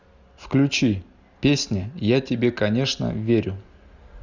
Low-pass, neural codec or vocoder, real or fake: 7.2 kHz; none; real